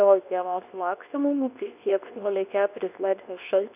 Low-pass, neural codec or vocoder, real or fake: 3.6 kHz; codec, 24 kHz, 0.9 kbps, WavTokenizer, medium speech release version 2; fake